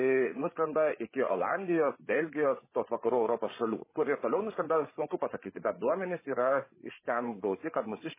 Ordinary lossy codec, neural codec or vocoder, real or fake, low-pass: MP3, 16 kbps; codec, 16 kHz, 16 kbps, FreqCodec, larger model; fake; 3.6 kHz